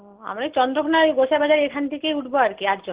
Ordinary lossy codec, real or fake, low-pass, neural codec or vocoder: Opus, 16 kbps; real; 3.6 kHz; none